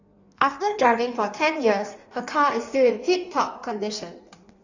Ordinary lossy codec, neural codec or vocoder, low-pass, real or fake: Opus, 64 kbps; codec, 16 kHz in and 24 kHz out, 1.1 kbps, FireRedTTS-2 codec; 7.2 kHz; fake